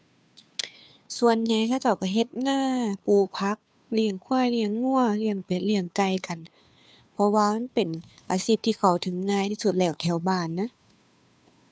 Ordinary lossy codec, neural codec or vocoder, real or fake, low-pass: none; codec, 16 kHz, 2 kbps, FunCodec, trained on Chinese and English, 25 frames a second; fake; none